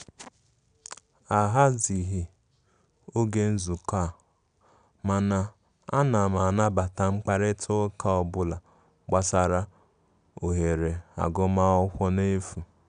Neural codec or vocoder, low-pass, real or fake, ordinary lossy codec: none; 9.9 kHz; real; none